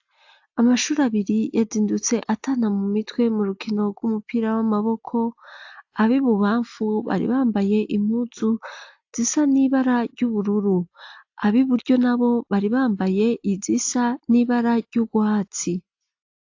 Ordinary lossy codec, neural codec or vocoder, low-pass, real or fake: AAC, 48 kbps; none; 7.2 kHz; real